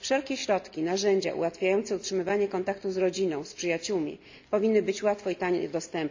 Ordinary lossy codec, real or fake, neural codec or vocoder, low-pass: MP3, 64 kbps; real; none; 7.2 kHz